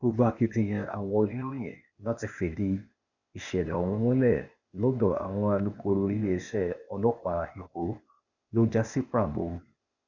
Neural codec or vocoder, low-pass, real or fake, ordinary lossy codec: codec, 16 kHz, 0.8 kbps, ZipCodec; 7.2 kHz; fake; none